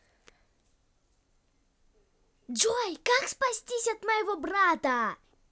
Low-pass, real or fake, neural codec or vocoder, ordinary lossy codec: none; real; none; none